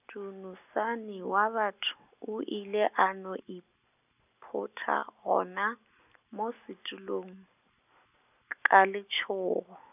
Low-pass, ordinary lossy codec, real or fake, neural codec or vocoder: 3.6 kHz; none; real; none